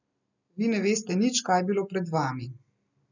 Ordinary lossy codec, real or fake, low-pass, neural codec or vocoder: none; real; 7.2 kHz; none